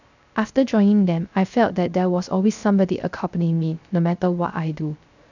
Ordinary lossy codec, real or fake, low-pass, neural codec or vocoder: none; fake; 7.2 kHz; codec, 16 kHz, 0.3 kbps, FocalCodec